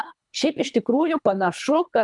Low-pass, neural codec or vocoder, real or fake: 10.8 kHz; codec, 24 kHz, 3 kbps, HILCodec; fake